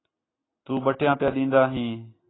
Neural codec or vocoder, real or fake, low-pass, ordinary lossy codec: none; real; 7.2 kHz; AAC, 16 kbps